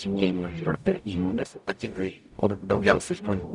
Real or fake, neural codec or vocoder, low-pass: fake; codec, 44.1 kHz, 0.9 kbps, DAC; 10.8 kHz